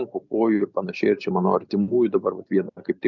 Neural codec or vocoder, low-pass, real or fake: none; 7.2 kHz; real